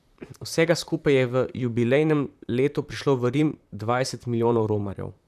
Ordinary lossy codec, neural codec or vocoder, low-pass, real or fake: none; vocoder, 44.1 kHz, 128 mel bands, Pupu-Vocoder; 14.4 kHz; fake